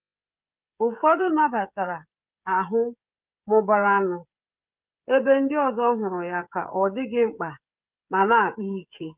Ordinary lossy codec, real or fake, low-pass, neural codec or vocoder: Opus, 24 kbps; fake; 3.6 kHz; codec, 16 kHz, 16 kbps, FreqCodec, smaller model